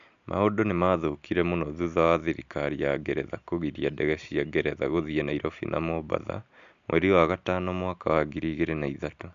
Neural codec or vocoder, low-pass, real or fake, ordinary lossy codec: none; 7.2 kHz; real; MP3, 64 kbps